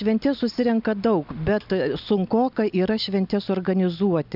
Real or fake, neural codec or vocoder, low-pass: real; none; 5.4 kHz